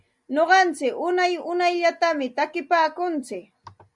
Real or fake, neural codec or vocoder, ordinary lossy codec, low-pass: real; none; Opus, 64 kbps; 10.8 kHz